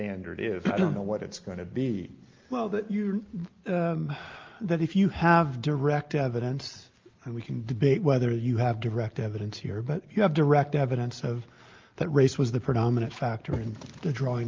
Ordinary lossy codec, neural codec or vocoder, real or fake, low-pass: Opus, 32 kbps; none; real; 7.2 kHz